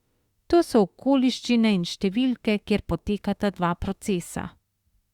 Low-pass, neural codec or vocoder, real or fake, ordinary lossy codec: 19.8 kHz; autoencoder, 48 kHz, 32 numbers a frame, DAC-VAE, trained on Japanese speech; fake; Opus, 64 kbps